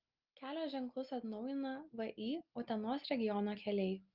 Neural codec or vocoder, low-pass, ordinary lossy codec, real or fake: none; 5.4 kHz; Opus, 32 kbps; real